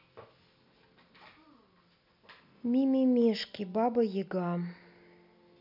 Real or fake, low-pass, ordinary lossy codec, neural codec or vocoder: real; 5.4 kHz; none; none